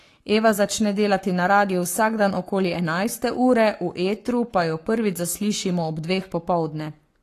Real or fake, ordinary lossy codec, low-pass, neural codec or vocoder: fake; AAC, 48 kbps; 14.4 kHz; codec, 44.1 kHz, 7.8 kbps, Pupu-Codec